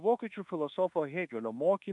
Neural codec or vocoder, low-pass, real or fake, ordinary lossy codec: codec, 24 kHz, 1.2 kbps, DualCodec; 10.8 kHz; fake; MP3, 64 kbps